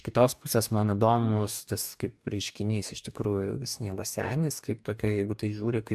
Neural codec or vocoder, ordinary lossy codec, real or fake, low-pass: codec, 44.1 kHz, 2.6 kbps, DAC; AAC, 96 kbps; fake; 14.4 kHz